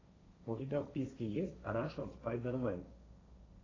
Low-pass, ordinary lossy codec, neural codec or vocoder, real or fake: 7.2 kHz; AAC, 32 kbps; codec, 16 kHz, 1.1 kbps, Voila-Tokenizer; fake